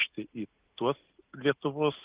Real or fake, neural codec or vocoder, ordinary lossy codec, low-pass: real; none; Opus, 32 kbps; 3.6 kHz